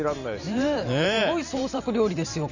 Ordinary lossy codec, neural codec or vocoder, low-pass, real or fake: none; vocoder, 44.1 kHz, 128 mel bands every 512 samples, BigVGAN v2; 7.2 kHz; fake